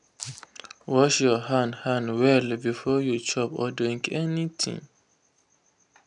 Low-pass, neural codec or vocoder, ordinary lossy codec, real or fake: 10.8 kHz; none; none; real